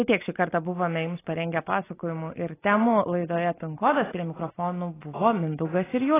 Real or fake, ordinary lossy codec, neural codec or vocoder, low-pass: real; AAC, 16 kbps; none; 3.6 kHz